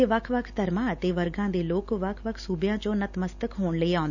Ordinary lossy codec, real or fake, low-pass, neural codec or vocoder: none; real; 7.2 kHz; none